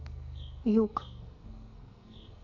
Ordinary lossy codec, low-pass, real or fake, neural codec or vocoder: none; 7.2 kHz; fake; codec, 44.1 kHz, 2.6 kbps, SNAC